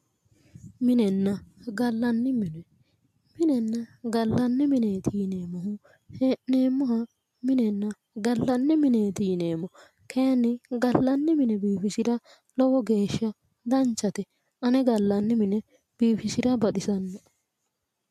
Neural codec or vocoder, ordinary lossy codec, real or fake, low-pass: none; MP3, 96 kbps; real; 14.4 kHz